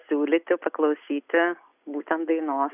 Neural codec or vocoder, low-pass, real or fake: none; 3.6 kHz; real